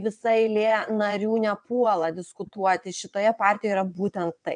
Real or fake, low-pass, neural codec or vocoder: fake; 9.9 kHz; vocoder, 22.05 kHz, 80 mel bands, WaveNeXt